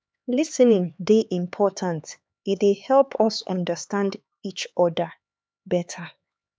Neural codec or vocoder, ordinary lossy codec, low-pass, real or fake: codec, 16 kHz, 4 kbps, X-Codec, HuBERT features, trained on LibriSpeech; none; none; fake